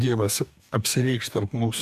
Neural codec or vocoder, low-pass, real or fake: codec, 44.1 kHz, 2.6 kbps, DAC; 14.4 kHz; fake